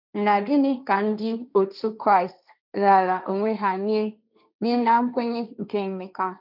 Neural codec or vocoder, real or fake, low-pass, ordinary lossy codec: codec, 16 kHz, 1.1 kbps, Voila-Tokenizer; fake; 5.4 kHz; none